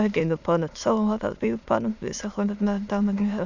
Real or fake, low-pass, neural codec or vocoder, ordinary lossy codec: fake; 7.2 kHz; autoencoder, 22.05 kHz, a latent of 192 numbers a frame, VITS, trained on many speakers; none